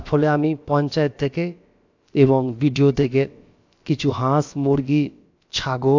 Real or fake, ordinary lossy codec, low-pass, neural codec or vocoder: fake; AAC, 48 kbps; 7.2 kHz; codec, 16 kHz, about 1 kbps, DyCAST, with the encoder's durations